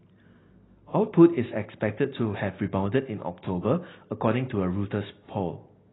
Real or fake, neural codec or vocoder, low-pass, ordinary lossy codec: real; none; 7.2 kHz; AAC, 16 kbps